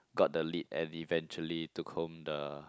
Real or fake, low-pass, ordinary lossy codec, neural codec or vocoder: real; none; none; none